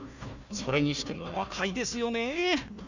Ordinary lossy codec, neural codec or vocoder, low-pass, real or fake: none; codec, 16 kHz, 1 kbps, FunCodec, trained on Chinese and English, 50 frames a second; 7.2 kHz; fake